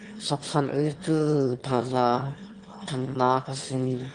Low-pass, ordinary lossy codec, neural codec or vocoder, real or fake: 9.9 kHz; Opus, 24 kbps; autoencoder, 22.05 kHz, a latent of 192 numbers a frame, VITS, trained on one speaker; fake